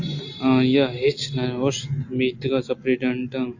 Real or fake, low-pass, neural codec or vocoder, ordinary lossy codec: real; 7.2 kHz; none; AAC, 48 kbps